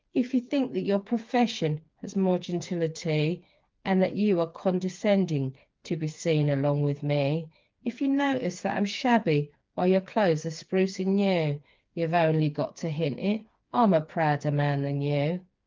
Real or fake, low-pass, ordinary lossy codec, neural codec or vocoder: fake; 7.2 kHz; Opus, 32 kbps; codec, 16 kHz, 4 kbps, FreqCodec, smaller model